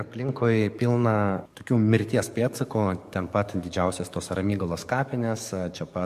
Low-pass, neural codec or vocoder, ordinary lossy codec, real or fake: 14.4 kHz; codec, 44.1 kHz, 7.8 kbps, DAC; MP3, 64 kbps; fake